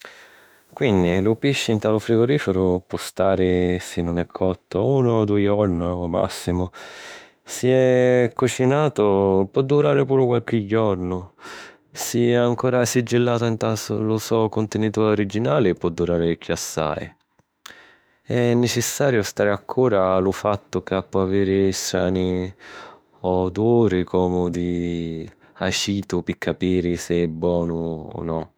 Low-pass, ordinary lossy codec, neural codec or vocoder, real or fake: none; none; autoencoder, 48 kHz, 32 numbers a frame, DAC-VAE, trained on Japanese speech; fake